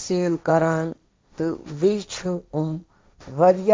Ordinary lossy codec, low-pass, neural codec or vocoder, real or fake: none; none; codec, 16 kHz, 1.1 kbps, Voila-Tokenizer; fake